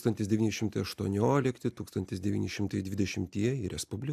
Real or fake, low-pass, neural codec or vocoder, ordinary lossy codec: real; 14.4 kHz; none; AAC, 96 kbps